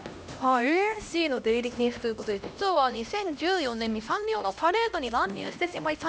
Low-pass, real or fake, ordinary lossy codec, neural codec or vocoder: none; fake; none; codec, 16 kHz, 1 kbps, X-Codec, HuBERT features, trained on LibriSpeech